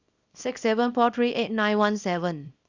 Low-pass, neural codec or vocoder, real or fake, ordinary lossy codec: 7.2 kHz; codec, 24 kHz, 0.9 kbps, WavTokenizer, small release; fake; Opus, 64 kbps